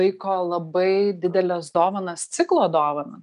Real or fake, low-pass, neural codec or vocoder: real; 10.8 kHz; none